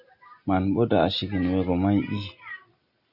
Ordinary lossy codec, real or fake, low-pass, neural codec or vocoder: Opus, 64 kbps; real; 5.4 kHz; none